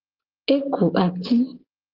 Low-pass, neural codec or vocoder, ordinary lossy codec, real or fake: 5.4 kHz; none; Opus, 16 kbps; real